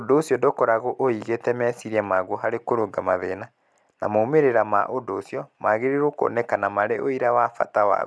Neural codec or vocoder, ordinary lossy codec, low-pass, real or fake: none; none; none; real